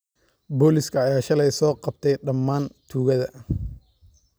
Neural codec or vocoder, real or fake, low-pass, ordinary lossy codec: none; real; none; none